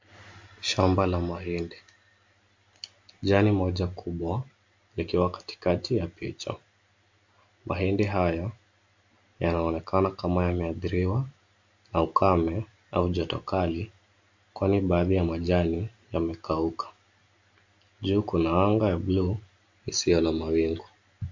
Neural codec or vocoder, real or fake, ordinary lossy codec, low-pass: none; real; MP3, 48 kbps; 7.2 kHz